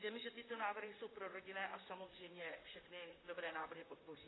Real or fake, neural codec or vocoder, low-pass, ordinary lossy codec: fake; vocoder, 44.1 kHz, 128 mel bands, Pupu-Vocoder; 7.2 kHz; AAC, 16 kbps